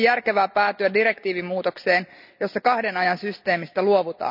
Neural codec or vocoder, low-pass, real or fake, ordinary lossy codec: none; 5.4 kHz; real; none